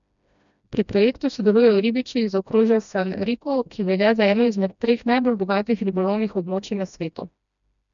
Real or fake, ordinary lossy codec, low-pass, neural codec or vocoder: fake; none; 7.2 kHz; codec, 16 kHz, 1 kbps, FreqCodec, smaller model